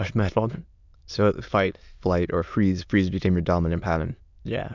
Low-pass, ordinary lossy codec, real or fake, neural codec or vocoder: 7.2 kHz; MP3, 64 kbps; fake; autoencoder, 22.05 kHz, a latent of 192 numbers a frame, VITS, trained on many speakers